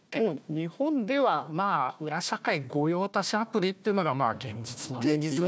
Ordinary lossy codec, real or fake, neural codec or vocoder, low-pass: none; fake; codec, 16 kHz, 1 kbps, FunCodec, trained on Chinese and English, 50 frames a second; none